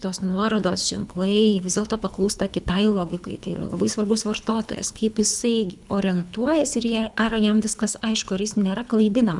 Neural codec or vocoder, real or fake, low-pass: codec, 24 kHz, 3 kbps, HILCodec; fake; 10.8 kHz